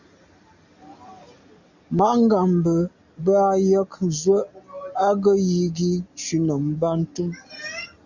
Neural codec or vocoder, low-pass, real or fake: none; 7.2 kHz; real